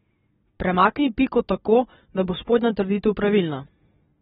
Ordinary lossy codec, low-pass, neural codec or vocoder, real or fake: AAC, 16 kbps; 7.2 kHz; none; real